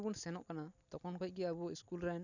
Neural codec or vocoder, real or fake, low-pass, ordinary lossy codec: none; real; 7.2 kHz; none